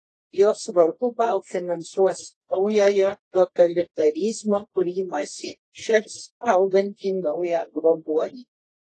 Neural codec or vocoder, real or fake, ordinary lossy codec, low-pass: codec, 24 kHz, 0.9 kbps, WavTokenizer, medium music audio release; fake; AAC, 32 kbps; 10.8 kHz